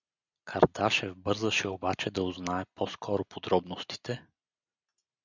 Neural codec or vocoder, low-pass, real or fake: none; 7.2 kHz; real